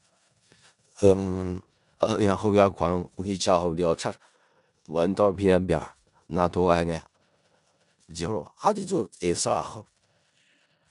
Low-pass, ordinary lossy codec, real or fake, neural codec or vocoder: 10.8 kHz; none; fake; codec, 16 kHz in and 24 kHz out, 0.4 kbps, LongCat-Audio-Codec, four codebook decoder